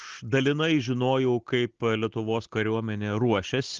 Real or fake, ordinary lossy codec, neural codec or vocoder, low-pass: real; Opus, 16 kbps; none; 7.2 kHz